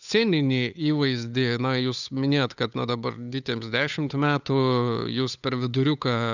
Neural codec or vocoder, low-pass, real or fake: codec, 16 kHz, 8 kbps, FunCodec, trained on Chinese and English, 25 frames a second; 7.2 kHz; fake